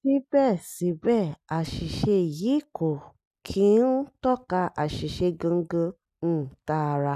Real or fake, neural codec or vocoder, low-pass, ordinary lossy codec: real; none; 14.4 kHz; none